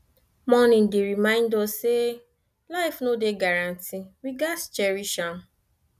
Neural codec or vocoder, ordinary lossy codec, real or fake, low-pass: none; none; real; 14.4 kHz